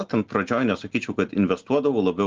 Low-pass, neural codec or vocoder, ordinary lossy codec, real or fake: 7.2 kHz; none; Opus, 16 kbps; real